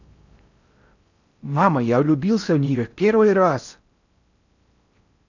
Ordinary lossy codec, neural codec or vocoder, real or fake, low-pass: none; codec, 16 kHz in and 24 kHz out, 0.6 kbps, FocalCodec, streaming, 4096 codes; fake; 7.2 kHz